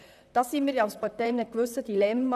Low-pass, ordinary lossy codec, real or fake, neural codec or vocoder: 14.4 kHz; none; fake; vocoder, 44.1 kHz, 128 mel bands, Pupu-Vocoder